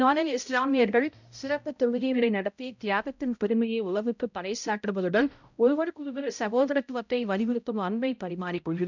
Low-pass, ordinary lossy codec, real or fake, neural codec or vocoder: 7.2 kHz; none; fake; codec, 16 kHz, 0.5 kbps, X-Codec, HuBERT features, trained on balanced general audio